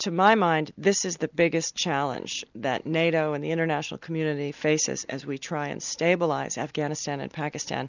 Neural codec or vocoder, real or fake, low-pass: none; real; 7.2 kHz